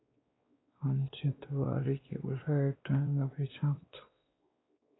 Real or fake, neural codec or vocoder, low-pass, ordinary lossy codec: fake; codec, 16 kHz, 2 kbps, X-Codec, WavLM features, trained on Multilingual LibriSpeech; 7.2 kHz; AAC, 16 kbps